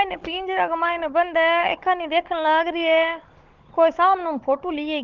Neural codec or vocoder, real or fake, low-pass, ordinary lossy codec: codec, 16 kHz, 16 kbps, FunCodec, trained on Chinese and English, 50 frames a second; fake; 7.2 kHz; Opus, 16 kbps